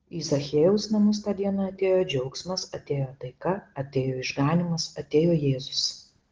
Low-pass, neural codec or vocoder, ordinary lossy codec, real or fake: 7.2 kHz; none; Opus, 16 kbps; real